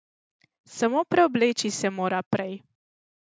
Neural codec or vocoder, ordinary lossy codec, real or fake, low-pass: none; none; real; none